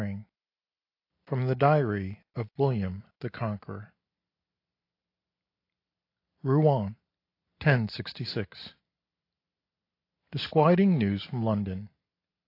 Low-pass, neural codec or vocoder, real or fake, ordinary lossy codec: 5.4 kHz; none; real; AAC, 32 kbps